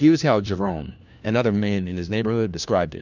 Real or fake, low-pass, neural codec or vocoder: fake; 7.2 kHz; codec, 16 kHz, 1 kbps, FunCodec, trained on LibriTTS, 50 frames a second